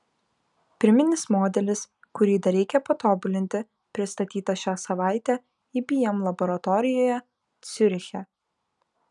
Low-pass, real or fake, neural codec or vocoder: 10.8 kHz; real; none